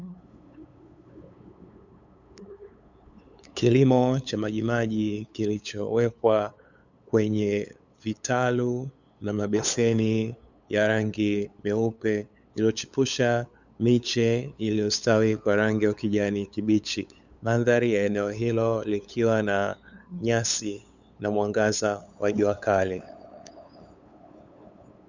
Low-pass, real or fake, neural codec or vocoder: 7.2 kHz; fake; codec, 16 kHz, 8 kbps, FunCodec, trained on LibriTTS, 25 frames a second